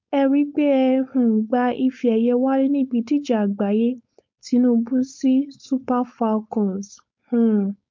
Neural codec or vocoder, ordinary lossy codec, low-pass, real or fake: codec, 16 kHz, 4.8 kbps, FACodec; MP3, 48 kbps; 7.2 kHz; fake